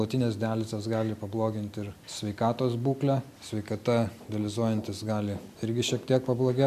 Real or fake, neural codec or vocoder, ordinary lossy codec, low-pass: real; none; MP3, 96 kbps; 14.4 kHz